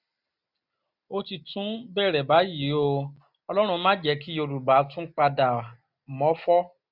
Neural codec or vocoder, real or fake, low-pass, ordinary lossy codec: none; real; 5.4 kHz; none